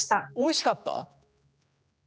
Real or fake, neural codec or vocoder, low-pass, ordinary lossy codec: fake; codec, 16 kHz, 2 kbps, X-Codec, HuBERT features, trained on general audio; none; none